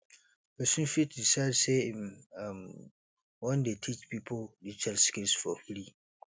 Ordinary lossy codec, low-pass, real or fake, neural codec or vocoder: none; none; real; none